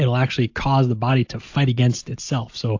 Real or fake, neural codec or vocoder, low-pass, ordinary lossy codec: real; none; 7.2 kHz; AAC, 48 kbps